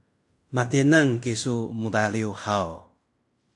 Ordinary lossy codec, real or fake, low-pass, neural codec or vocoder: AAC, 64 kbps; fake; 10.8 kHz; codec, 16 kHz in and 24 kHz out, 0.9 kbps, LongCat-Audio-Codec, fine tuned four codebook decoder